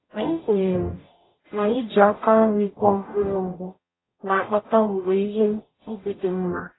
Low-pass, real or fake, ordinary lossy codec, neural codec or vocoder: 7.2 kHz; fake; AAC, 16 kbps; codec, 44.1 kHz, 0.9 kbps, DAC